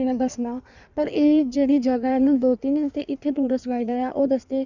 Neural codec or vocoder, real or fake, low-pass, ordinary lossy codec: codec, 16 kHz in and 24 kHz out, 1.1 kbps, FireRedTTS-2 codec; fake; 7.2 kHz; none